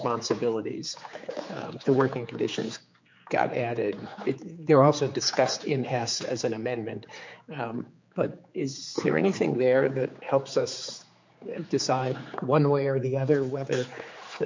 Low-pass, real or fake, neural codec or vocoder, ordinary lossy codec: 7.2 kHz; fake; codec, 16 kHz, 4 kbps, X-Codec, HuBERT features, trained on general audio; MP3, 48 kbps